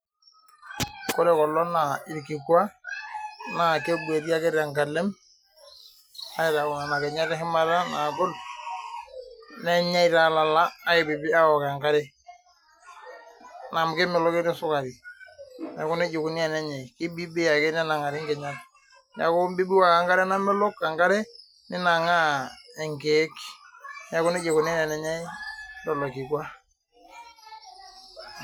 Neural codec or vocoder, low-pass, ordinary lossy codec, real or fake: none; none; none; real